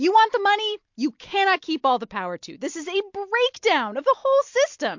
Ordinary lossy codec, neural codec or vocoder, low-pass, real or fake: MP3, 48 kbps; none; 7.2 kHz; real